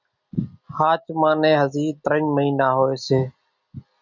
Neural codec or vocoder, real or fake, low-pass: none; real; 7.2 kHz